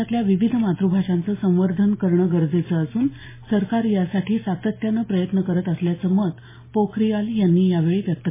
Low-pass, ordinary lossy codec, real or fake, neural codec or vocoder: 3.6 kHz; MP3, 16 kbps; real; none